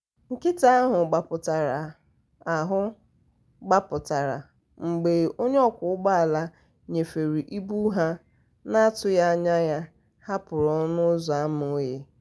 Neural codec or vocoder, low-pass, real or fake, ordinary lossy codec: none; none; real; none